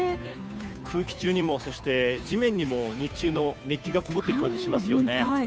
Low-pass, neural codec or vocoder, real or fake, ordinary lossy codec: none; codec, 16 kHz, 2 kbps, FunCodec, trained on Chinese and English, 25 frames a second; fake; none